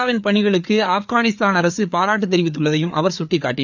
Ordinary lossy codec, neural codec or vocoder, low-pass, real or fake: none; codec, 16 kHz, 4 kbps, FreqCodec, larger model; 7.2 kHz; fake